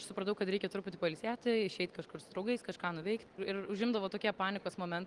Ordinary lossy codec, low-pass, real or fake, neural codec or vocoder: Opus, 24 kbps; 10.8 kHz; real; none